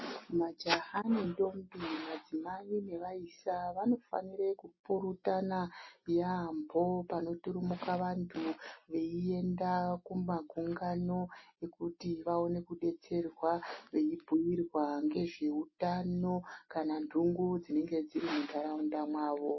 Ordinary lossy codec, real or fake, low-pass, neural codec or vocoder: MP3, 24 kbps; real; 7.2 kHz; none